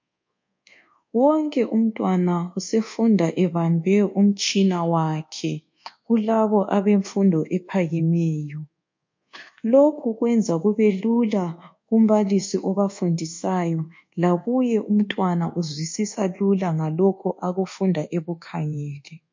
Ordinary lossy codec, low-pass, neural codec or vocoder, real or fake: MP3, 48 kbps; 7.2 kHz; codec, 24 kHz, 1.2 kbps, DualCodec; fake